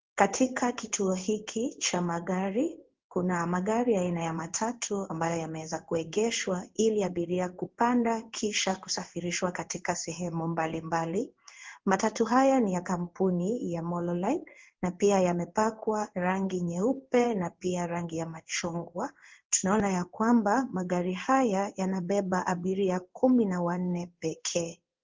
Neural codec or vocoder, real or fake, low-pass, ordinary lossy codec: codec, 16 kHz in and 24 kHz out, 1 kbps, XY-Tokenizer; fake; 7.2 kHz; Opus, 16 kbps